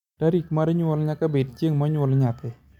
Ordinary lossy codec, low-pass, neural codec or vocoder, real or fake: none; 19.8 kHz; none; real